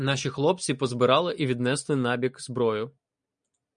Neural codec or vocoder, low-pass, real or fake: none; 9.9 kHz; real